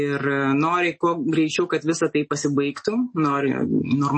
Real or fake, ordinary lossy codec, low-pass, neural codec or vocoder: real; MP3, 32 kbps; 9.9 kHz; none